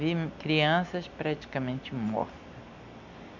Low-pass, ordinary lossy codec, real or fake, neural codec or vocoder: 7.2 kHz; none; real; none